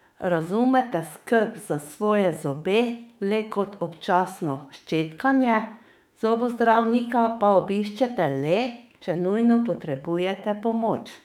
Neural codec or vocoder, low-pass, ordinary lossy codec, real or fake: autoencoder, 48 kHz, 32 numbers a frame, DAC-VAE, trained on Japanese speech; 19.8 kHz; none; fake